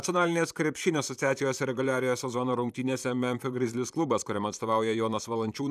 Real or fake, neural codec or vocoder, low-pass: fake; vocoder, 44.1 kHz, 128 mel bands, Pupu-Vocoder; 14.4 kHz